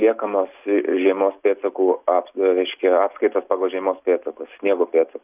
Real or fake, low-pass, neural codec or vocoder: real; 3.6 kHz; none